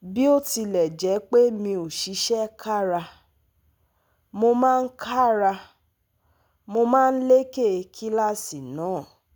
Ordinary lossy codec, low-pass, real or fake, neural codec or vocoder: none; none; real; none